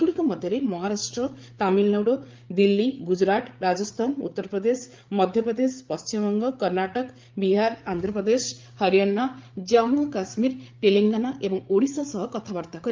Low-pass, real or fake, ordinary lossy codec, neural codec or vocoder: 7.2 kHz; fake; Opus, 24 kbps; codec, 16 kHz, 8 kbps, FreqCodec, larger model